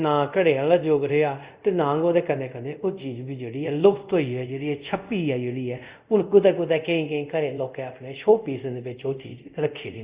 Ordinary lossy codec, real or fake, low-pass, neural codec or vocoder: Opus, 64 kbps; fake; 3.6 kHz; codec, 24 kHz, 0.5 kbps, DualCodec